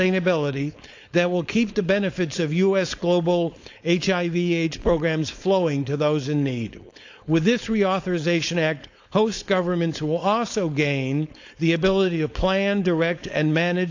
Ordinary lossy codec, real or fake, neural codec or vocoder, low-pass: AAC, 48 kbps; fake; codec, 16 kHz, 4.8 kbps, FACodec; 7.2 kHz